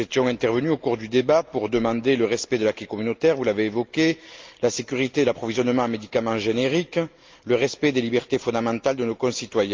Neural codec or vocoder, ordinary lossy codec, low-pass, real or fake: none; Opus, 24 kbps; 7.2 kHz; real